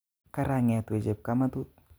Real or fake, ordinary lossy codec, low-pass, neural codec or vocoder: real; none; none; none